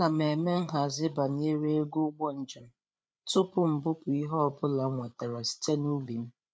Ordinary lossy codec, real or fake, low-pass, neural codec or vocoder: none; fake; none; codec, 16 kHz, 8 kbps, FreqCodec, larger model